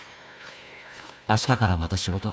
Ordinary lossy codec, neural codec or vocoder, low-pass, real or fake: none; codec, 16 kHz, 1 kbps, FunCodec, trained on Chinese and English, 50 frames a second; none; fake